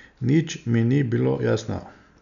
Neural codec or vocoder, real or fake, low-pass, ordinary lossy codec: none; real; 7.2 kHz; none